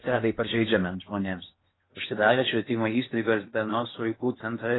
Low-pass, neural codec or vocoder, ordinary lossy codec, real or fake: 7.2 kHz; codec, 16 kHz in and 24 kHz out, 0.8 kbps, FocalCodec, streaming, 65536 codes; AAC, 16 kbps; fake